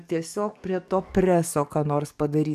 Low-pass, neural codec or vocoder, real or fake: 14.4 kHz; codec, 44.1 kHz, 7.8 kbps, DAC; fake